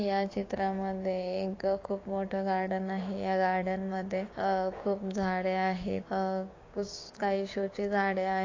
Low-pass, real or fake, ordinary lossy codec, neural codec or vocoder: 7.2 kHz; fake; AAC, 32 kbps; codec, 16 kHz, 6 kbps, DAC